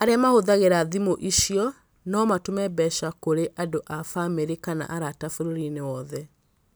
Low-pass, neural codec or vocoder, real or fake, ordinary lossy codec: none; none; real; none